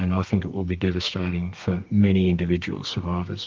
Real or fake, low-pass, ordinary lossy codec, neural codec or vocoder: fake; 7.2 kHz; Opus, 24 kbps; codec, 32 kHz, 1.9 kbps, SNAC